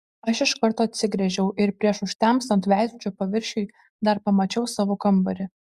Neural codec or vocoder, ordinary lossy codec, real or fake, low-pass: none; Opus, 64 kbps; real; 14.4 kHz